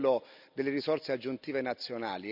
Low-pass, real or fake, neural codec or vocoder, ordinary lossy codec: 5.4 kHz; real; none; none